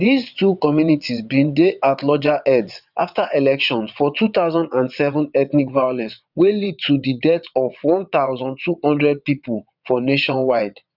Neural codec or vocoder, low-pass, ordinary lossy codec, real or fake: vocoder, 22.05 kHz, 80 mel bands, WaveNeXt; 5.4 kHz; none; fake